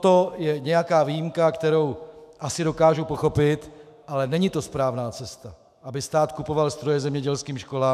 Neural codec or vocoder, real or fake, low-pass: autoencoder, 48 kHz, 128 numbers a frame, DAC-VAE, trained on Japanese speech; fake; 14.4 kHz